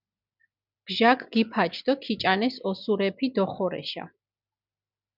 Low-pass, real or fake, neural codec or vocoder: 5.4 kHz; real; none